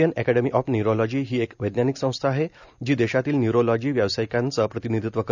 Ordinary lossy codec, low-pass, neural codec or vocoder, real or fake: none; 7.2 kHz; none; real